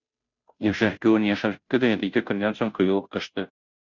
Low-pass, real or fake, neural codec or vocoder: 7.2 kHz; fake; codec, 16 kHz, 0.5 kbps, FunCodec, trained on Chinese and English, 25 frames a second